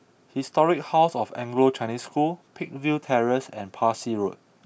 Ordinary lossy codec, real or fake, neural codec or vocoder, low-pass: none; real; none; none